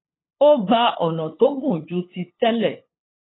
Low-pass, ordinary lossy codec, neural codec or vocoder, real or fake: 7.2 kHz; AAC, 16 kbps; codec, 16 kHz, 8 kbps, FunCodec, trained on LibriTTS, 25 frames a second; fake